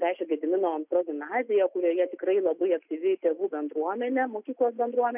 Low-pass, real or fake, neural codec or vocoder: 3.6 kHz; real; none